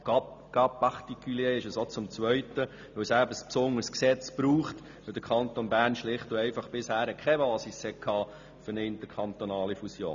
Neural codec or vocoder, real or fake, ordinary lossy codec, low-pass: none; real; none; 7.2 kHz